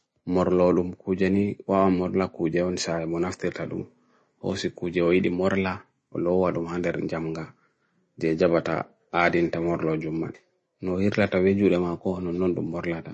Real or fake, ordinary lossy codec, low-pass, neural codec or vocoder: real; MP3, 32 kbps; 10.8 kHz; none